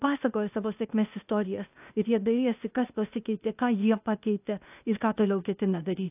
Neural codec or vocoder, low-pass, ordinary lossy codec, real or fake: codec, 16 kHz, 0.8 kbps, ZipCodec; 3.6 kHz; AAC, 32 kbps; fake